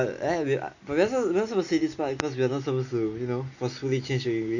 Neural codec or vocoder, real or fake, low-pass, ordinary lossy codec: none; real; 7.2 kHz; none